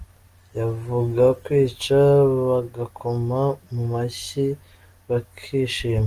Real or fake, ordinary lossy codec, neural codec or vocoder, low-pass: real; Opus, 24 kbps; none; 14.4 kHz